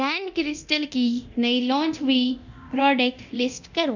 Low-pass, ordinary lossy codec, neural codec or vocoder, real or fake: 7.2 kHz; none; codec, 24 kHz, 0.9 kbps, DualCodec; fake